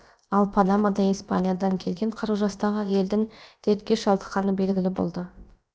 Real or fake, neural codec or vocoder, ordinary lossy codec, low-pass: fake; codec, 16 kHz, about 1 kbps, DyCAST, with the encoder's durations; none; none